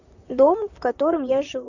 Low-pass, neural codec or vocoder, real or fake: 7.2 kHz; vocoder, 44.1 kHz, 128 mel bands, Pupu-Vocoder; fake